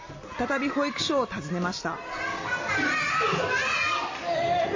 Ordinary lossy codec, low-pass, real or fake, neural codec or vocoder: MP3, 32 kbps; 7.2 kHz; real; none